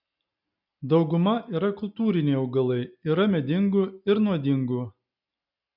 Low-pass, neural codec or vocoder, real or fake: 5.4 kHz; none; real